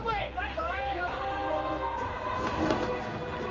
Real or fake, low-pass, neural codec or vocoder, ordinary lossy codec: fake; 7.2 kHz; codec, 16 kHz in and 24 kHz out, 1 kbps, XY-Tokenizer; Opus, 32 kbps